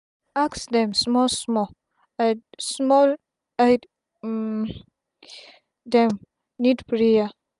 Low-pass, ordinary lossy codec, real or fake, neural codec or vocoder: 10.8 kHz; none; real; none